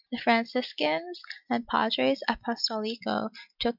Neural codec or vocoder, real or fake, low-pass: none; real; 5.4 kHz